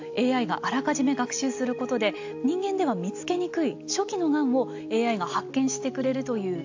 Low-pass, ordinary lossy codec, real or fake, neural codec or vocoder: 7.2 kHz; AAC, 48 kbps; real; none